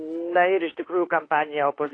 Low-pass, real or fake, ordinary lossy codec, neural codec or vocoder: 9.9 kHz; fake; AAC, 32 kbps; autoencoder, 48 kHz, 32 numbers a frame, DAC-VAE, trained on Japanese speech